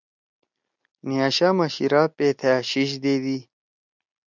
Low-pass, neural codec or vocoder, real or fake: 7.2 kHz; none; real